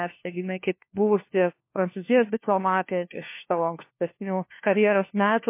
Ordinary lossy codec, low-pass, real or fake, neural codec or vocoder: MP3, 24 kbps; 3.6 kHz; fake; codec, 16 kHz, 1 kbps, FunCodec, trained on LibriTTS, 50 frames a second